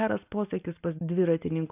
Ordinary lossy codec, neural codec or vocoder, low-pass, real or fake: AAC, 24 kbps; none; 3.6 kHz; real